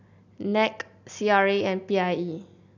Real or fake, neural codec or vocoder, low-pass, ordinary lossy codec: real; none; 7.2 kHz; none